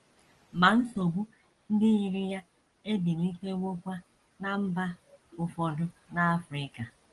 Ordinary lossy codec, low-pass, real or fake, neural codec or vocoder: Opus, 24 kbps; 10.8 kHz; real; none